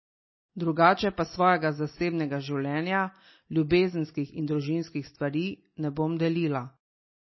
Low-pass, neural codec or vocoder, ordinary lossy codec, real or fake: 7.2 kHz; none; MP3, 24 kbps; real